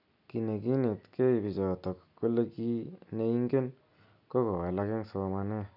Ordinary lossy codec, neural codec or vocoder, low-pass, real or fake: none; none; 5.4 kHz; real